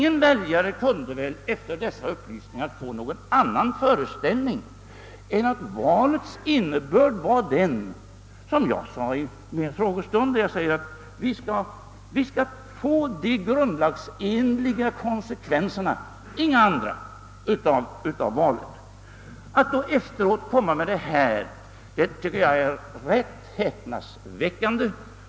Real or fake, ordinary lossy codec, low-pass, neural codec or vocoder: real; none; none; none